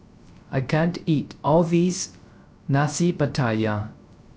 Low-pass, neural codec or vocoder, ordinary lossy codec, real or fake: none; codec, 16 kHz, 0.3 kbps, FocalCodec; none; fake